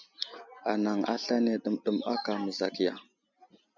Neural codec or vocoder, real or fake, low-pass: none; real; 7.2 kHz